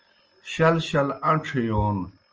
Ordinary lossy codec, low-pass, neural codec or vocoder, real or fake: Opus, 24 kbps; 7.2 kHz; none; real